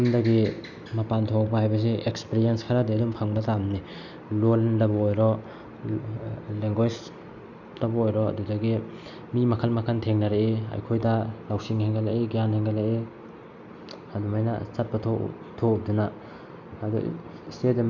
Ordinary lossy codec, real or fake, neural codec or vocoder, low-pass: none; real; none; 7.2 kHz